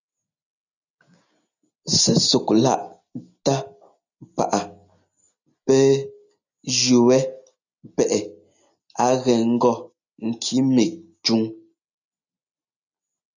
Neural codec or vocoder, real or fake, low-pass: none; real; 7.2 kHz